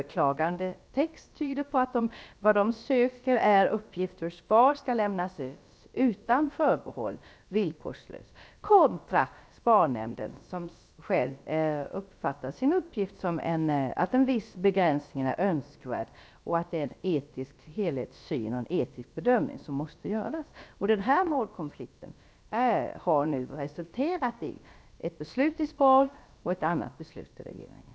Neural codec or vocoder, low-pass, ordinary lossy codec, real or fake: codec, 16 kHz, about 1 kbps, DyCAST, with the encoder's durations; none; none; fake